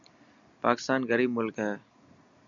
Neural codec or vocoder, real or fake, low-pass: none; real; 7.2 kHz